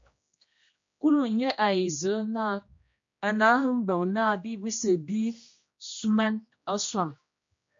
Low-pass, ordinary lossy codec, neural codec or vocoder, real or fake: 7.2 kHz; MP3, 48 kbps; codec, 16 kHz, 1 kbps, X-Codec, HuBERT features, trained on general audio; fake